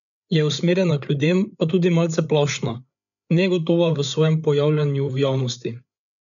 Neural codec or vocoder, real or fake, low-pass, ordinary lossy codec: codec, 16 kHz, 8 kbps, FreqCodec, larger model; fake; 7.2 kHz; none